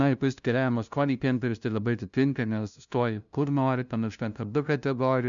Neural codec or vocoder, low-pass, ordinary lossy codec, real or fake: codec, 16 kHz, 0.5 kbps, FunCodec, trained on LibriTTS, 25 frames a second; 7.2 kHz; MP3, 96 kbps; fake